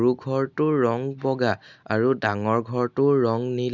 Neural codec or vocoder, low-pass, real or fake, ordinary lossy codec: none; 7.2 kHz; real; none